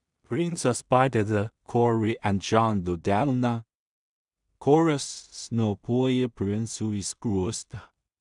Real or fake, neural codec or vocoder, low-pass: fake; codec, 16 kHz in and 24 kHz out, 0.4 kbps, LongCat-Audio-Codec, two codebook decoder; 10.8 kHz